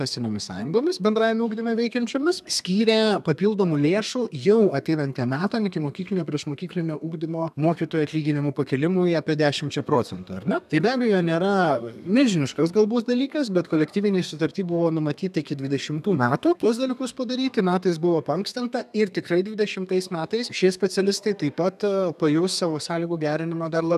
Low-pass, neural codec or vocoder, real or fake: 14.4 kHz; codec, 32 kHz, 1.9 kbps, SNAC; fake